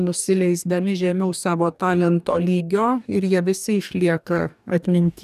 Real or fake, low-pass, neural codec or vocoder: fake; 14.4 kHz; codec, 44.1 kHz, 2.6 kbps, DAC